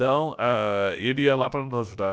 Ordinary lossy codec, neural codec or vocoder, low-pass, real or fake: none; codec, 16 kHz, about 1 kbps, DyCAST, with the encoder's durations; none; fake